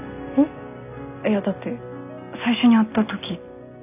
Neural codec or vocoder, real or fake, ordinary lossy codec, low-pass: none; real; none; 3.6 kHz